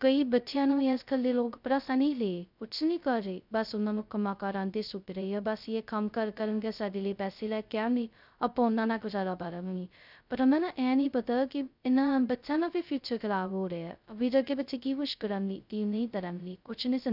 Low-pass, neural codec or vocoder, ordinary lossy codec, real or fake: 5.4 kHz; codec, 16 kHz, 0.2 kbps, FocalCodec; none; fake